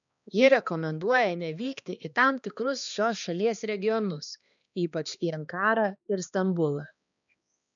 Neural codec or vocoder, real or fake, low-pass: codec, 16 kHz, 2 kbps, X-Codec, HuBERT features, trained on balanced general audio; fake; 7.2 kHz